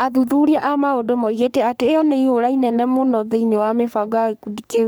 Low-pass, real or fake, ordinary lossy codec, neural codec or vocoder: none; fake; none; codec, 44.1 kHz, 3.4 kbps, Pupu-Codec